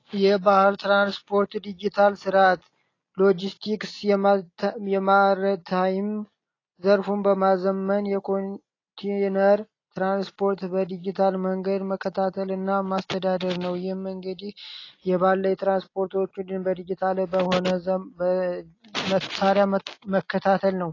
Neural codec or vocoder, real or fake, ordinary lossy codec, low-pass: none; real; AAC, 32 kbps; 7.2 kHz